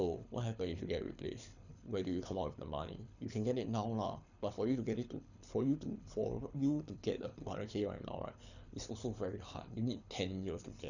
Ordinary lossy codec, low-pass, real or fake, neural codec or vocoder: none; 7.2 kHz; fake; codec, 24 kHz, 6 kbps, HILCodec